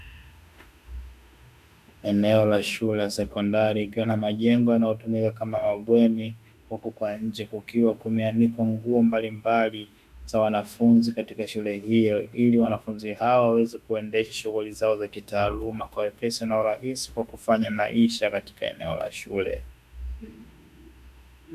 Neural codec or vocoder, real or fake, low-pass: autoencoder, 48 kHz, 32 numbers a frame, DAC-VAE, trained on Japanese speech; fake; 14.4 kHz